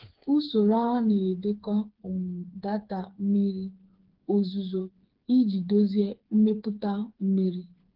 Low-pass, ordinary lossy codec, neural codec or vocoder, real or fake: 5.4 kHz; Opus, 16 kbps; codec, 16 kHz, 4 kbps, FreqCodec, smaller model; fake